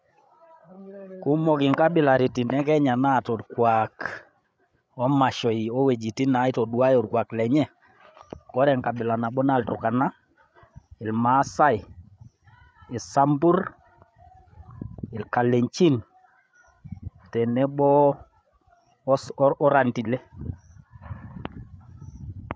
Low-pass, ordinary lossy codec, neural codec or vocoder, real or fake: none; none; codec, 16 kHz, 8 kbps, FreqCodec, larger model; fake